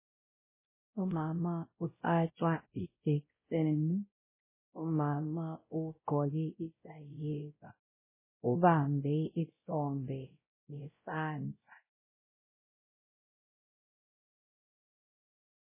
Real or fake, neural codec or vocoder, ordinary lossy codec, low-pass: fake; codec, 16 kHz, 0.5 kbps, X-Codec, WavLM features, trained on Multilingual LibriSpeech; MP3, 16 kbps; 3.6 kHz